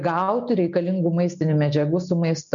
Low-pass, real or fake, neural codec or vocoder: 7.2 kHz; real; none